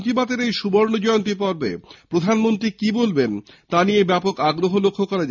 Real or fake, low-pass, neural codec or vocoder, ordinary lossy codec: real; 7.2 kHz; none; none